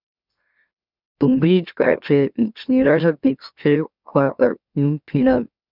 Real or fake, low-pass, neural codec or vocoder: fake; 5.4 kHz; autoencoder, 44.1 kHz, a latent of 192 numbers a frame, MeloTTS